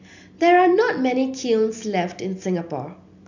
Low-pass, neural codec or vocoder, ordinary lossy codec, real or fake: 7.2 kHz; none; none; real